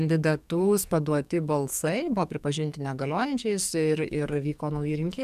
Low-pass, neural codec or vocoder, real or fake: 14.4 kHz; codec, 44.1 kHz, 2.6 kbps, SNAC; fake